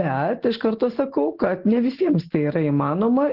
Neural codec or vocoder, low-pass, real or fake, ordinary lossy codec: none; 5.4 kHz; real; Opus, 16 kbps